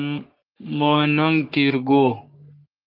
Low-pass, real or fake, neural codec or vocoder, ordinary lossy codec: 5.4 kHz; fake; codec, 44.1 kHz, 3.4 kbps, Pupu-Codec; Opus, 32 kbps